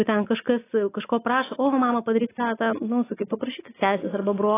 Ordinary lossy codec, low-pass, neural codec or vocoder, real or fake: AAC, 16 kbps; 3.6 kHz; none; real